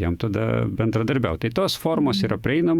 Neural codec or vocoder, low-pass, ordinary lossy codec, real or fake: autoencoder, 48 kHz, 128 numbers a frame, DAC-VAE, trained on Japanese speech; 19.8 kHz; Opus, 64 kbps; fake